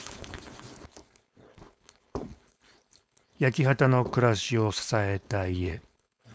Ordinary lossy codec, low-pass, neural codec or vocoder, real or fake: none; none; codec, 16 kHz, 4.8 kbps, FACodec; fake